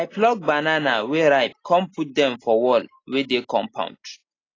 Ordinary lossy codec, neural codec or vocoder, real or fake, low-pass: AAC, 32 kbps; none; real; 7.2 kHz